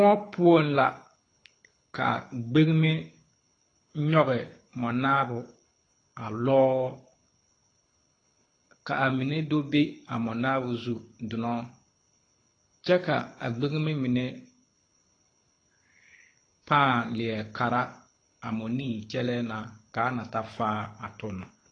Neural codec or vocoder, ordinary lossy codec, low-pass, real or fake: codec, 24 kHz, 6 kbps, HILCodec; AAC, 32 kbps; 9.9 kHz; fake